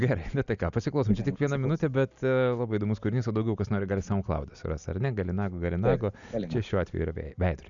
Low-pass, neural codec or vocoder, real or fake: 7.2 kHz; none; real